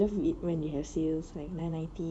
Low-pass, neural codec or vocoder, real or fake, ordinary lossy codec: 9.9 kHz; vocoder, 24 kHz, 100 mel bands, Vocos; fake; none